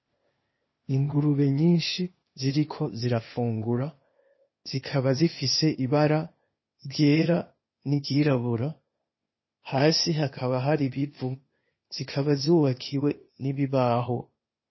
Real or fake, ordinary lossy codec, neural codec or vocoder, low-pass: fake; MP3, 24 kbps; codec, 16 kHz, 0.8 kbps, ZipCodec; 7.2 kHz